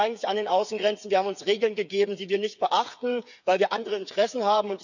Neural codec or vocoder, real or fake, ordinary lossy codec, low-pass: codec, 16 kHz, 8 kbps, FreqCodec, smaller model; fake; none; 7.2 kHz